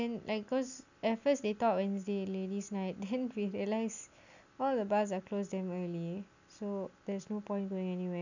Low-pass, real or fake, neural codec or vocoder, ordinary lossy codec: 7.2 kHz; real; none; none